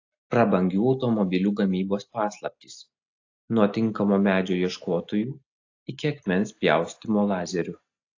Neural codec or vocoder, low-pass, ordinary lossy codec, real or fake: none; 7.2 kHz; AAC, 48 kbps; real